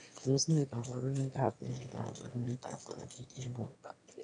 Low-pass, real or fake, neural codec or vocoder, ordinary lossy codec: 9.9 kHz; fake; autoencoder, 22.05 kHz, a latent of 192 numbers a frame, VITS, trained on one speaker; none